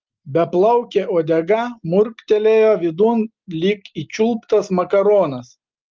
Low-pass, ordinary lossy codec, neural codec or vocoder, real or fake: 7.2 kHz; Opus, 32 kbps; none; real